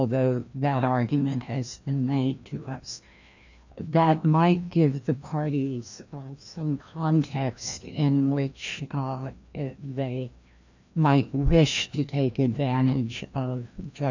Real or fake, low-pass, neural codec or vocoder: fake; 7.2 kHz; codec, 16 kHz, 1 kbps, FreqCodec, larger model